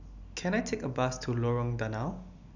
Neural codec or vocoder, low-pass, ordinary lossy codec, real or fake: none; 7.2 kHz; none; real